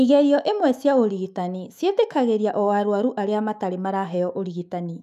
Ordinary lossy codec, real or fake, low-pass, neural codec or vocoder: none; fake; 14.4 kHz; autoencoder, 48 kHz, 128 numbers a frame, DAC-VAE, trained on Japanese speech